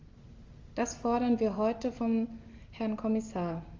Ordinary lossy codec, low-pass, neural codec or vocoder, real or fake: Opus, 32 kbps; 7.2 kHz; none; real